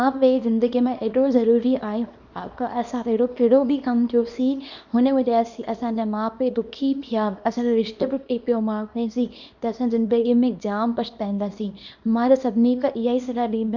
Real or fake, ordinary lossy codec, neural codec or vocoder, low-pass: fake; none; codec, 24 kHz, 0.9 kbps, WavTokenizer, small release; 7.2 kHz